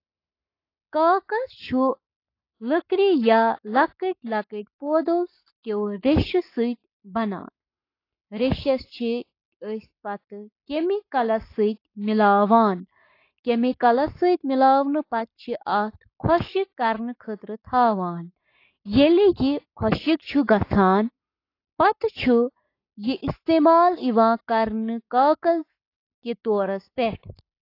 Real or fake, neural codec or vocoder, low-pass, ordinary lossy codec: fake; codec, 44.1 kHz, 7.8 kbps, Pupu-Codec; 5.4 kHz; AAC, 32 kbps